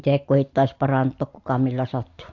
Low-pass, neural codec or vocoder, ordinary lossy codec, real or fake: 7.2 kHz; none; AAC, 48 kbps; real